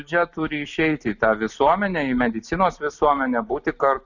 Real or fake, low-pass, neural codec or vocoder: real; 7.2 kHz; none